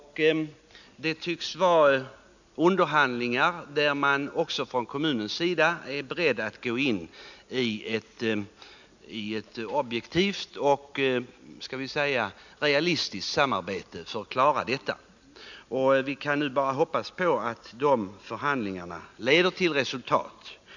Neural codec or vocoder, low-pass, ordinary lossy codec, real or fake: none; 7.2 kHz; none; real